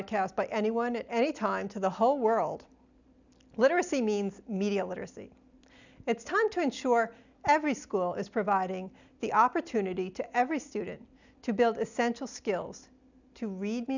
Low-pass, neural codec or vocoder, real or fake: 7.2 kHz; none; real